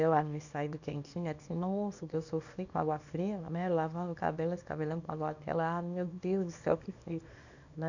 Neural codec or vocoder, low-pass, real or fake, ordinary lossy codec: codec, 24 kHz, 0.9 kbps, WavTokenizer, small release; 7.2 kHz; fake; none